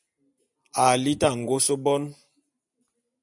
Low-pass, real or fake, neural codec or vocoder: 10.8 kHz; real; none